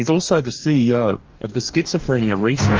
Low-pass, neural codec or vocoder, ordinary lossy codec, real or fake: 7.2 kHz; codec, 44.1 kHz, 2.6 kbps, DAC; Opus, 24 kbps; fake